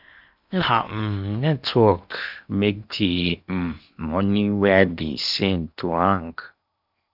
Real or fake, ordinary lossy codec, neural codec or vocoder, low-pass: fake; none; codec, 16 kHz in and 24 kHz out, 0.8 kbps, FocalCodec, streaming, 65536 codes; 5.4 kHz